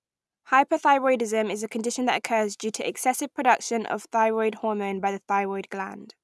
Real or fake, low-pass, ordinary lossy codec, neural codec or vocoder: real; none; none; none